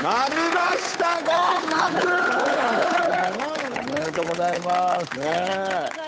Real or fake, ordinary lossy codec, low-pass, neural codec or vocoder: fake; none; none; codec, 16 kHz, 8 kbps, FunCodec, trained on Chinese and English, 25 frames a second